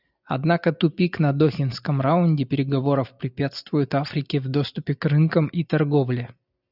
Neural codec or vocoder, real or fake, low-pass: none; real; 5.4 kHz